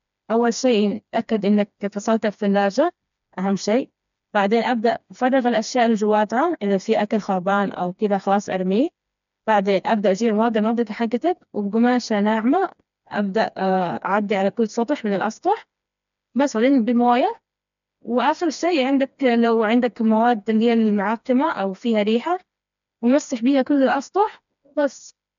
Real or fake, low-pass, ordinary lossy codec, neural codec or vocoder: fake; 7.2 kHz; none; codec, 16 kHz, 2 kbps, FreqCodec, smaller model